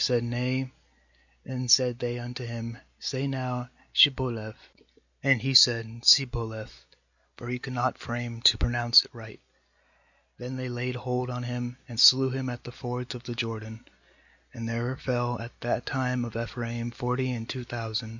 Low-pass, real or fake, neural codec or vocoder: 7.2 kHz; real; none